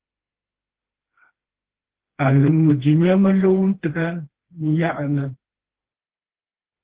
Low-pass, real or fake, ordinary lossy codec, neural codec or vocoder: 3.6 kHz; fake; Opus, 16 kbps; codec, 16 kHz, 2 kbps, FreqCodec, smaller model